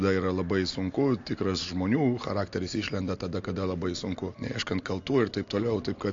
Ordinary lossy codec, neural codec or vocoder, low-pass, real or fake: AAC, 48 kbps; none; 7.2 kHz; real